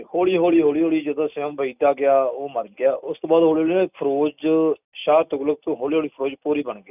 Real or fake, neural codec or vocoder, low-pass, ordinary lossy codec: real; none; 3.6 kHz; none